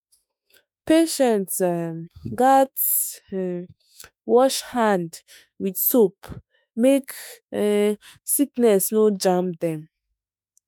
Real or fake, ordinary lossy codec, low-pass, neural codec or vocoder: fake; none; none; autoencoder, 48 kHz, 32 numbers a frame, DAC-VAE, trained on Japanese speech